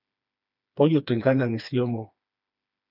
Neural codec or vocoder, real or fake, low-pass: codec, 16 kHz, 2 kbps, FreqCodec, smaller model; fake; 5.4 kHz